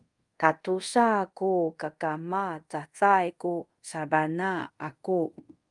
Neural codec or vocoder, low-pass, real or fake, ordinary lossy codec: codec, 24 kHz, 0.5 kbps, DualCodec; 10.8 kHz; fake; Opus, 32 kbps